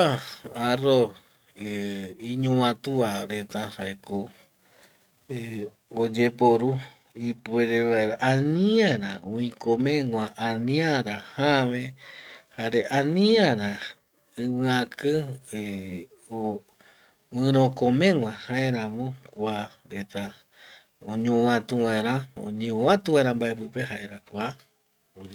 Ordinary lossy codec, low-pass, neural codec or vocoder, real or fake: none; 19.8 kHz; codec, 44.1 kHz, 7.8 kbps, DAC; fake